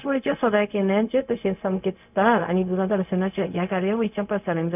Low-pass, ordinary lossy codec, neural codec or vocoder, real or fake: 3.6 kHz; none; codec, 16 kHz, 0.4 kbps, LongCat-Audio-Codec; fake